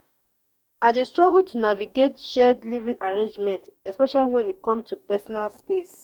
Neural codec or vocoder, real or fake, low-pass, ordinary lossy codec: codec, 44.1 kHz, 2.6 kbps, DAC; fake; 19.8 kHz; none